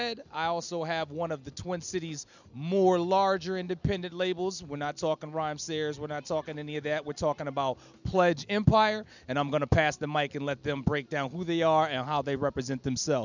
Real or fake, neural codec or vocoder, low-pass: real; none; 7.2 kHz